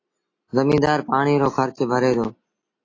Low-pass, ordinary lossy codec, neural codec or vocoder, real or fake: 7.2 kHz; AAC, 32 kbps; none; real